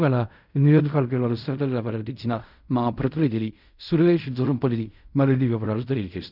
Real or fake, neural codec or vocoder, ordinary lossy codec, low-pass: fake; codec, 16 kHz in and 24 kHz out, 0.4 kbps, LongCat-Audio-Codec, fine tuned four codebook decoder; none; 5.4 kHz